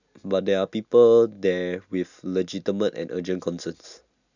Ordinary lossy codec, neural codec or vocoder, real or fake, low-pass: none; none; real; 7.2 kHz